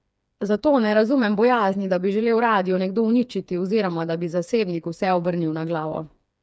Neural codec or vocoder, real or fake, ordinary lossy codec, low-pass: codec, 16 kHz, 4 kbps, FreqCodec, smaller model; fake; none; none